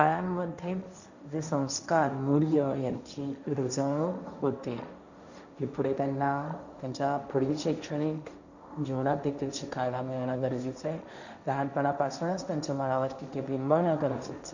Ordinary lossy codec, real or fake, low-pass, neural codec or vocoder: none; fake; 7.2 kHz; codec, 16 kHz, 1.1 kbps, Voila-Tokenizer